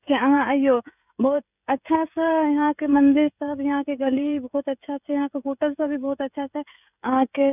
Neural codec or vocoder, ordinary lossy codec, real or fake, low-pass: codec, 16 kHz, 16 kbps, FreqCodec, smaller model; none; fake; 3.6 kHz